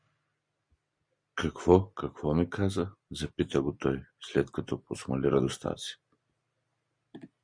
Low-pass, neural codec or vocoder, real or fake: 9.9 kHz; none; real